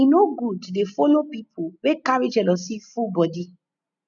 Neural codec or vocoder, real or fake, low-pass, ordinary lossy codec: none; real; 7.2 kHz; none